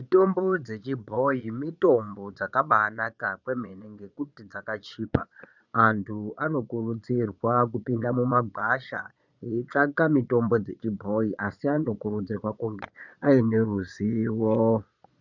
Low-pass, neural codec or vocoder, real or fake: 7.2 kHz; vocoder, 22.05 kHz, 80 mel bands, Vocos; fake